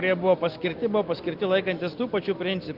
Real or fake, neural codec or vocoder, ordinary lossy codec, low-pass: real; none; Opus, 24 kbps; 5.4 kHz